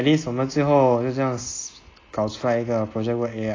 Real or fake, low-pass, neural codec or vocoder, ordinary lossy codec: real; 7.2 kHz; none; AAC, 32 kbps